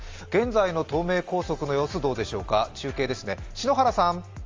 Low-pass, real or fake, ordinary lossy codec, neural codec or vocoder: 7.2 kHz; real; Opus, 32 kbps; none